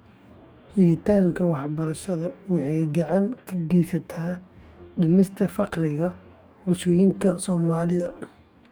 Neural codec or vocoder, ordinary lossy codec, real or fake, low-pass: codec, 44.1 kHz, 2.6 kbps, DAC; none; fake; none